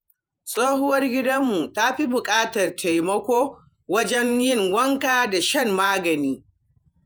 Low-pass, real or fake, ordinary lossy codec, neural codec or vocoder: none; fake; none; vocoder, 48 kHz, 128 mel bands, Vocos